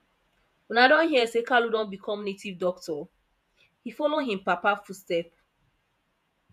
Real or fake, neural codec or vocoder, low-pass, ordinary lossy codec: fake; vocoder, 48 kHz, 128 mel bands, Vocos; 14.4 kHz; none